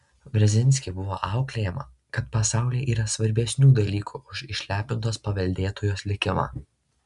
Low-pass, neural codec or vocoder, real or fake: 10.8 kHz; none; real